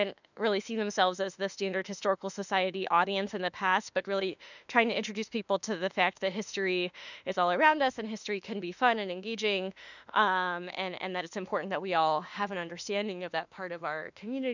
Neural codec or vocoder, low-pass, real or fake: autoencoder, 48 kHz, 32 numbers a frame, DAC-VAE, trained on Japanese speech; 7.2 kHz; fake